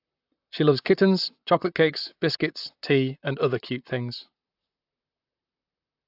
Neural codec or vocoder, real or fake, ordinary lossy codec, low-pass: vocoder, 44.1 kHz, 128 mel bands, Pupu-Vocoder; fake; AAC, 48 kbps; 5.4 kHz